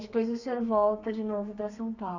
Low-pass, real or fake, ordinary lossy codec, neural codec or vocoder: 7.2 kHz; fake; none; codec, 32 kHz, 1.9 kbps, SNAC